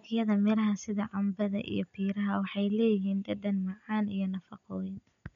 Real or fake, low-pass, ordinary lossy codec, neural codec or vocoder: real; 7.2 kHz; none; none